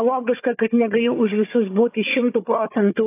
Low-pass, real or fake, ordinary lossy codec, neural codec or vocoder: 3.6 kHz; fake; AAC, 24 kbps; codec, 16 kHz, 4 kbps, FunCodec, trained on Chinese and English, 50 frames a second